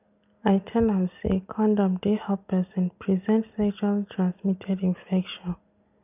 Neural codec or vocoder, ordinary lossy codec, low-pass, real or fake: none; none; 3.6 kHz; real